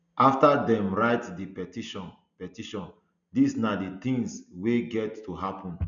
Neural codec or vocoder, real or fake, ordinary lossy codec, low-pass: none; real; none; 7.2 kHz